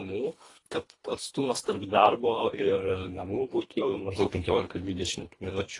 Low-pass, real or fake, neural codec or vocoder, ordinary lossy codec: 10.8 kHz; fake; codec, 24 kHz, 1.5 kbps, HILCodec; AAC, 32 kbps